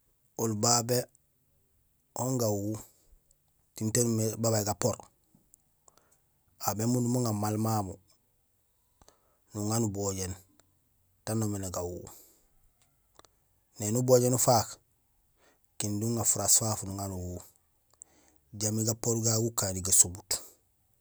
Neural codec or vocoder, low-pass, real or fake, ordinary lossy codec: none; none; real; none